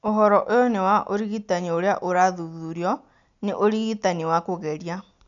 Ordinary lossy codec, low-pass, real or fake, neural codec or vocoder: none; 7.2 kHz; real; none